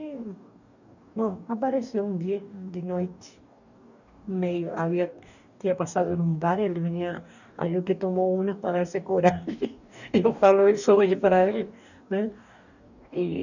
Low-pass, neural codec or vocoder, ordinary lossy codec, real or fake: 7.2 kHz; codec, 44.1 kHz, 2.6 kbps, DAC; none; fake